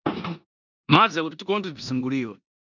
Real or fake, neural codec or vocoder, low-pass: fake; codec, 16 kHz in and 24 kHz out, 0.9 kbps, LongCat-Audio-Codec, four codebook decoder; 7.2 kHz